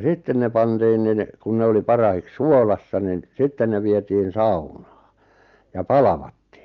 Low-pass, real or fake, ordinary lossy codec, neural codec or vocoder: 7.2 kHz; real; Opus, 32 kbps; none